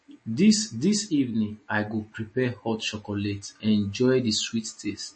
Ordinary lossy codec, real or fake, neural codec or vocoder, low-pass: MP3, 32 kbps; real; none; 9.9 kHz